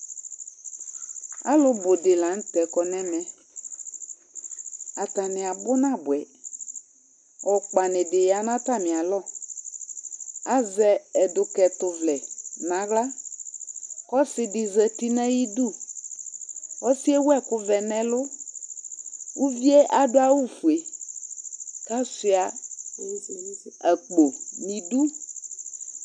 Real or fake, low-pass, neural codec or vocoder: real; 9.9 kHz; none